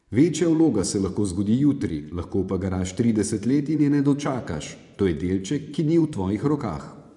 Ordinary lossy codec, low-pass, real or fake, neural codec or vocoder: none; 10.8 kHz; fake; vocoder, 24 kHz, 100 mel bands, Vocos